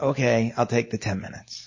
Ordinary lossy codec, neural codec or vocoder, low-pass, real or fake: MP3, 32 kbps; none; 7.2 kHz; real